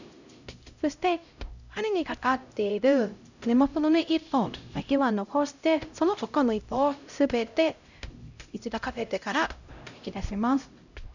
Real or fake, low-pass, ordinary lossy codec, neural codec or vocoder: fake; 7.2 kHz; none; codec, 16 kHz, 0.5 kbps, X-Codec, HuBERT features, trained on LibriSpeech